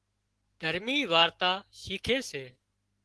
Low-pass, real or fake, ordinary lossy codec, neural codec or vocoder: 10.8 kHz; fake; Opus, 16 kbps; autoencoder, 48 kHz, 128 numbers a frame, DAC-VAE, trained on Japanese speech